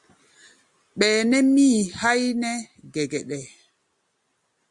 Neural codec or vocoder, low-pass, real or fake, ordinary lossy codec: none; 10.8 kHz; real; Opus, 64 kbps